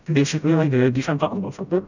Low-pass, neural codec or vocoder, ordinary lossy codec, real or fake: 7.2 kHz; codec, 16 kHz, 0.5 kbps, FreqCodec, smaller model; none; fake